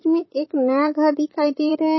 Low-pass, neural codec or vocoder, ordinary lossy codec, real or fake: 7.2 kHz; vocoder, 44.1 kHz, 128 mel bands, Pupu-Vocoder; MP3, 24 kbps; fake